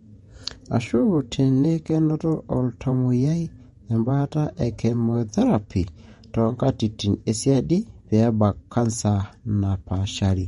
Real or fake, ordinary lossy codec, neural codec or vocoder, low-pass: fake; MP3, 48 kbps; vocoder, 48 kHz, 128 mel bands, Vocos; 19.8 kHz